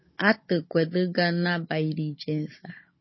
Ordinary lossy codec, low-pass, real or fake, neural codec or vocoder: MP3, 24 kbps; 7.2 kHz; fake; codec, 16 kHz, 16 kbps, FunCodec, trained on Chinese and English, 50 frames a second